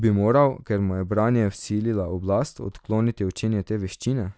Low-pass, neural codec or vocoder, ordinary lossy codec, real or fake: none; none; none; real